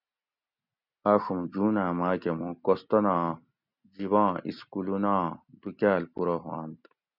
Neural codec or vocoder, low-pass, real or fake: none; 5.4 kHz; real